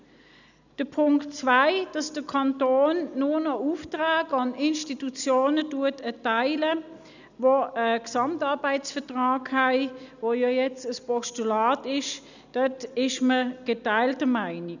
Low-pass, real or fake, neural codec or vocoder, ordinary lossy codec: 7.2 kHz; real; none; none